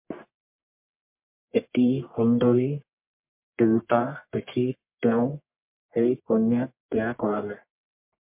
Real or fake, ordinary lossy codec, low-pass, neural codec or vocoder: fake; MP3, 32 kbps; 3.6 kHz; codec, 44.1 kHz, 1.7 kbps, Pupu-Codec